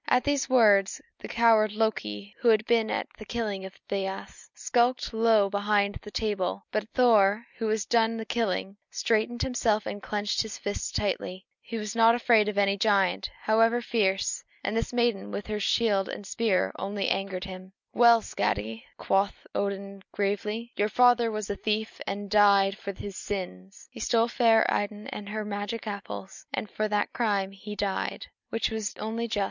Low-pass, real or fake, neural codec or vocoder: 7.2 kHz; real; none